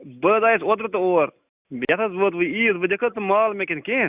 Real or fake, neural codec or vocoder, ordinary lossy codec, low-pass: real; none; Opus, 64 kbps; 3.6 kHz